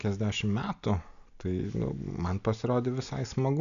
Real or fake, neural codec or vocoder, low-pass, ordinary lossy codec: real; none; 7.2 kHz; MP3, 96 kbps